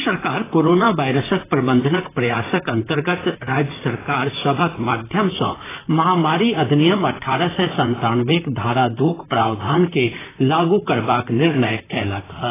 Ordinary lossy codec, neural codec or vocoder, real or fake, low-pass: AAC, 16 kbps; vocoder, 44.1 kHz, 128 mel bands, Pupu-Vocoder; fake; 3.6 kHz